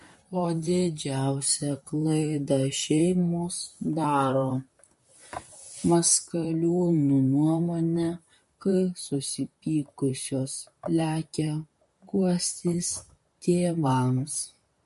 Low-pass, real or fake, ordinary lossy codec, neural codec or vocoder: 14.4 kHz; fake; MP3, 48 kbps; vocoder, 44.1 kHz, 128 mel bands, Pupu-Vocoder